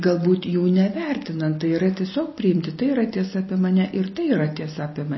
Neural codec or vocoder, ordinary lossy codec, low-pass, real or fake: none; MP3, 24 kbps; 7.2 kHz; real